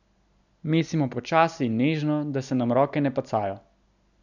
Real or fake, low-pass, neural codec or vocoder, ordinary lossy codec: real; 7.2 kHz; none; none